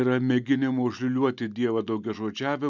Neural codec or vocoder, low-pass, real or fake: none; 7.2 kHz; real